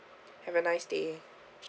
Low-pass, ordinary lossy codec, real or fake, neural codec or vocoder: none; none; real; none